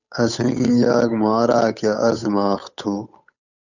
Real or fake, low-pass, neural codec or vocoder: fake; 7.2 kHz; codec, 16 kHz, 8 kbps, FunCodec, trained on Chinese and English, 25 frames a second